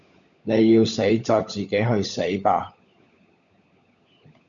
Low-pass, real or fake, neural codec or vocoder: 7.2 kHz; fake; codec, 16 kHz, 16 kbps, FunCodec, trained on LibriTTS, 50 frames a second